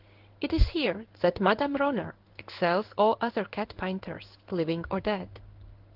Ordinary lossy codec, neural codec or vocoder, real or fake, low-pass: Opus, 16 kbps; codec, 16 kHz in and 24 kHz out, 1 kbps, XY-Tokenizer; fake; 5.4 kHz